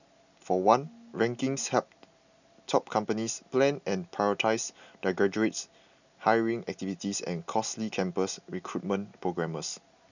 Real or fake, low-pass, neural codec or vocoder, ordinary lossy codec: real; 7.2 kHz; none; none